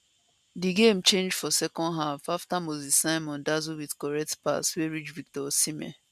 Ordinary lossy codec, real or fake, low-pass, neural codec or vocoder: none; real; 14.4 kHz; none